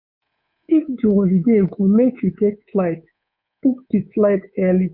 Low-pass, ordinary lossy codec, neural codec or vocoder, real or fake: 5.4 kHz; MP3, 48 kbps; codec, 24 kHz, 3.1 kbps, DualCodec; fake